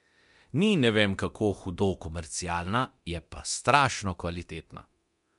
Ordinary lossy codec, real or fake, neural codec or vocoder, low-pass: MP3, 64 kbps; fake; codec, 24 kHz, 0.9 kbps, DualCodec; 10.8 kHz